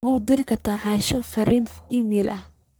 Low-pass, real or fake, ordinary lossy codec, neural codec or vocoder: none; fake; none; codec, 44.1 kHz, 1.7 kbps, Pupu-Codec